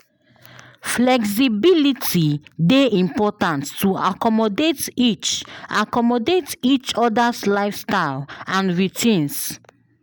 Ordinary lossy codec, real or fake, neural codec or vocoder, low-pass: none; real; none; none